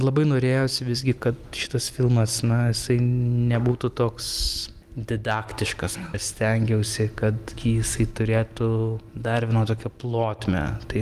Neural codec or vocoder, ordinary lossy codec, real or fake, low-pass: none; Opus, 32 kbps; real; 14.4 kHz